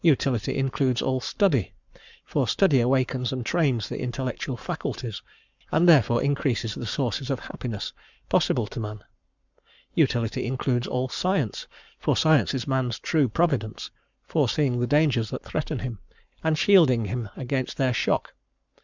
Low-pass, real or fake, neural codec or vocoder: 7.2 kHz; fake; codec, 44.1 kHz, 7.8 kbps, Pupu-Codec